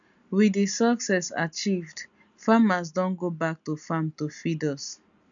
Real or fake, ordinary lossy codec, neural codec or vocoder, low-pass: real; none; none; 7.2 kHz